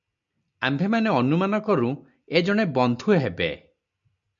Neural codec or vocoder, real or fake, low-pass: none; real; 7.2 kHz